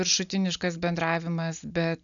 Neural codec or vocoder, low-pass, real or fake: none; 7.2 kHz; real